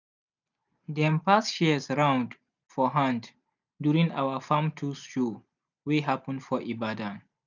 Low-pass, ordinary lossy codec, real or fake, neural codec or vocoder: 7.2 kHz; none; real; none